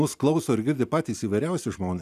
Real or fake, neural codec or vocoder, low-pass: real; none; 14.4 kHz